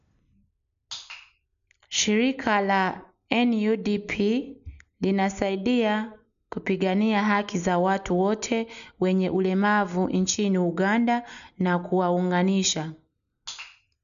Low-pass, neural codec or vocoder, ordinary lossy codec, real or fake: 7.2 kHz; none; none; real